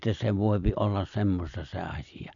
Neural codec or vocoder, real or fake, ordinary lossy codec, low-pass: none; real; none; 7.2 kHz